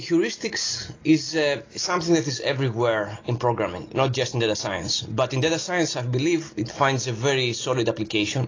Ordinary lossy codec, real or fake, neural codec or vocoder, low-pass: AAC, 32 kbps; real; none; 7.2 kHz